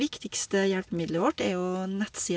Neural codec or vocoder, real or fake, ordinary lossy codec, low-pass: none; real; none; none